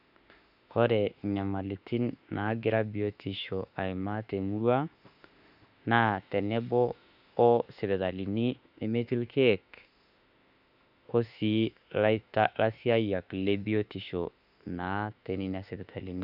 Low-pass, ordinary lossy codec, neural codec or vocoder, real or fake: 5.4 kHz; none; autoencoder, 48 kHz, 32 numbers a frame, DAC-VAE, trained on Japanese speech; fake